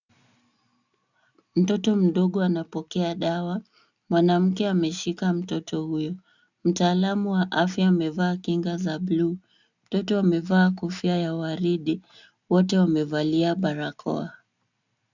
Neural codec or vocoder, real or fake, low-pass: none; real; 7.2 kHz